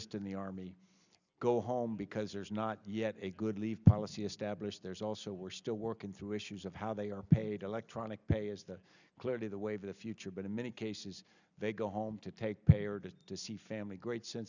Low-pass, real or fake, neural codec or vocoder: 7.2 kHz; real; none